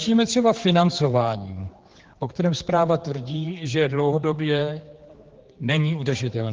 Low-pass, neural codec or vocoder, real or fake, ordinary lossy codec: 7.2 kHz; codec, 16 kHz, 4 kbps, X-Codec, HuBERT features, trained on general audio; fake; Opus, 16 kbps